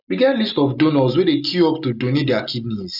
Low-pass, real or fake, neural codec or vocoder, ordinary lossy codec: 5.4 kHz; real; none; none